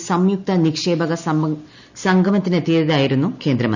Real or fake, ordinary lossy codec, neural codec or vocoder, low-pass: real; none; none; 7.2 kHz